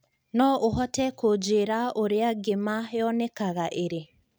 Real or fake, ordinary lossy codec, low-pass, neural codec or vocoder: real; none; none; none